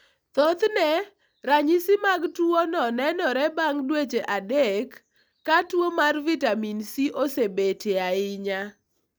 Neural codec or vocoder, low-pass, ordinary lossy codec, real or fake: none; none; none; real